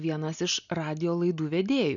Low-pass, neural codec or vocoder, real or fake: 7.2 kHz; none; real